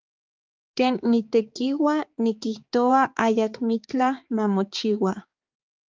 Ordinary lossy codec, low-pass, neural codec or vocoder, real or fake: Opus, 24 kbps; 7.2 kHz; codec, 16 kHz, 4 kbps, X-Codec, HuBERT features, trained on balanced general audio; fake